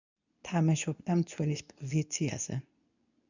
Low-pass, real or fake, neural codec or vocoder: 7.2 kHz; fake; codec, 24 kHz, 0.9 kbps, WavTokenizer, medium speech release version 2